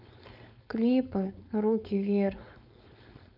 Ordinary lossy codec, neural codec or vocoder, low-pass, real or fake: none; codec, 16 kHz, 4.8 kbps, FACodec; 5.4 kHz; fake